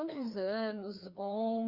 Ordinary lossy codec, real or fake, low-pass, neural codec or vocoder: Opus, 64 kbps; fake; 5.4 kHz; codec, 16 kHz, 2 kbps, FreqCodec, larger model